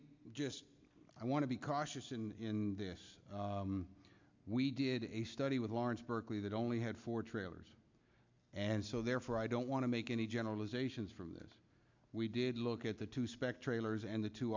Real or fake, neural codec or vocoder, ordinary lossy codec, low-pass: real; none; MP3, 64 kbps; 7.2 kHz